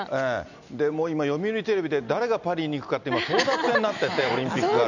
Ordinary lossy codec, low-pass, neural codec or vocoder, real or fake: none; 7.2 kHz; none; real